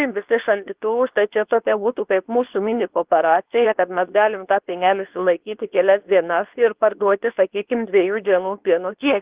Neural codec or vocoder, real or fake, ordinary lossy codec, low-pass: codec, 24 kHz, 0.9 kbps, WavTokenizer, medium speech release version 2; fake; Opus, 32 kbps; 3.6 kHz